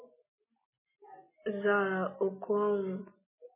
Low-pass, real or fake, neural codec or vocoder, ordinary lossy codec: 3.6 kHz; real; none; MP3, 16 kbps